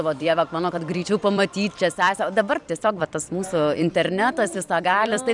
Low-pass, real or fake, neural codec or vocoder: 10.8 kHz; fake; vocoder, 44.1 kHz, 128 mel bands every 256 samples, BigVGAN v2